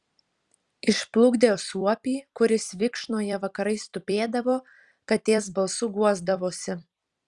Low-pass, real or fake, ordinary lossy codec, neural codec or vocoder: 10.8 kHz; fake; Opus, 64 kbps; vocoder, 44.1 kHz, 128 mel bands every 256 samples, BigVGAN v2